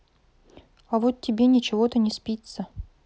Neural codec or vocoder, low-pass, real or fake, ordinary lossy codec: none; none; real; none